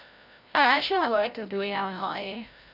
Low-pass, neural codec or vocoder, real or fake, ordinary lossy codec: 5.4 kHz; codec, 16 kHz, 0.5 kbps, FreqCodec, larger model; fake; none